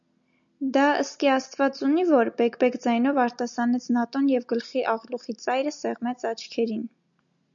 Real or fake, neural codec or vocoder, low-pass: real; none; 7.2 kHz